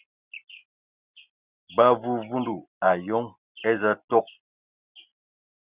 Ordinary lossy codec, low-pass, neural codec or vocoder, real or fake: Opus, 64 kbps; 3.6 kHz; none; real